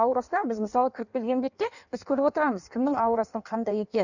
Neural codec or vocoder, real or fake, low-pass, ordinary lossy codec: codec, 16 kHz in and 24 kHz out, 1.1 kbps, FireRedTTS-2 codec; fake; 7.2 kHz; none